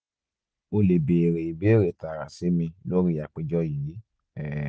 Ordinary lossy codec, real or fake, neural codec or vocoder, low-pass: none; real; none; none